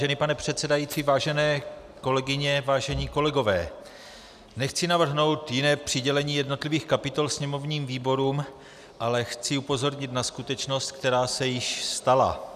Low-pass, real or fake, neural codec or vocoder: 14.4 kHz; real; none